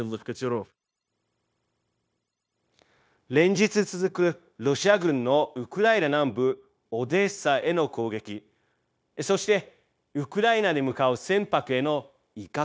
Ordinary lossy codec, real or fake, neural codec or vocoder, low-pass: none; fake; codec, 16 kHz, 0.9 kbps, LongCat-Audio-Codec; none